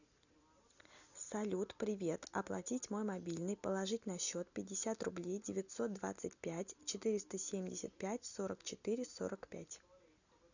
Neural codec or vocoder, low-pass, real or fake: none; 7.2 kHz; real